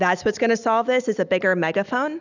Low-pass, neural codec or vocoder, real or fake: 7.2 kHz; none; real